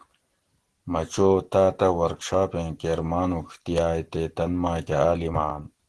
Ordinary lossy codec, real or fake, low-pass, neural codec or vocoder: Opus, 16 kbps; real; 10.8 kHz; none